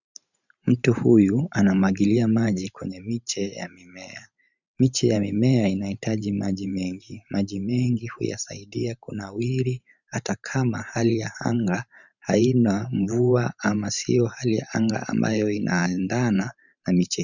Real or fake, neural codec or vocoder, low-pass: fake; vocoder, 44.1 kHz, 128 mel bands every 512 samples, BigVGAN v2; 7.2 kHz